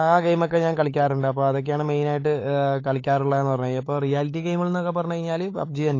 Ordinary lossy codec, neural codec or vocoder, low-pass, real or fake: AAC, 32 kbps; codec, 16 kHz, 8 kbps, FunCodec, trained on LibriTTS, 25 frames a second; 7.2 kHz; fake